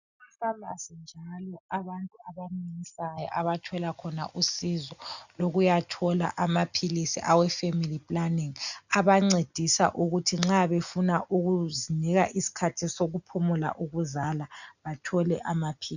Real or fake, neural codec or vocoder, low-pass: real; none; 7.2 kHz